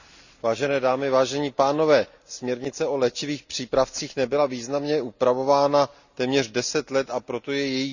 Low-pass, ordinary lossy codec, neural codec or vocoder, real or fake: 7.2 kHz; none; none; real